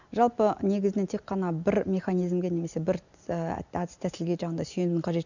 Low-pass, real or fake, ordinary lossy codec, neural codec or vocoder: 7.2 kHz; real; none; none